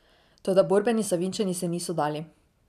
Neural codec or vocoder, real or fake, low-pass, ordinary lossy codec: none; real; 14.4 kHz; none